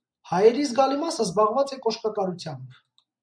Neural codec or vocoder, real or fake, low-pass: none; real; 9.9 kHz